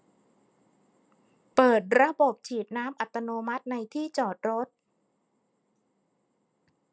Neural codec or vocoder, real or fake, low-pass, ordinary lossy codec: none; real; none; none